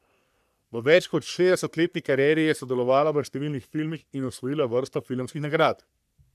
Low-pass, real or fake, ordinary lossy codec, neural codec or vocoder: 14.4 kHz; fake; none; codec, 44.1 kHz, 3.4 kbps, Pupu-Codec